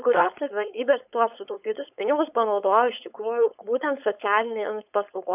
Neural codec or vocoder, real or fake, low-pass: codec, 16 kHz, 4.8 kbps, FACodec; fake; 3.6 kHz